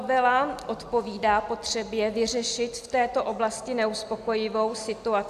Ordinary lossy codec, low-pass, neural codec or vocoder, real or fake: AAC, 96 kbps; 14.4 kHz; none; real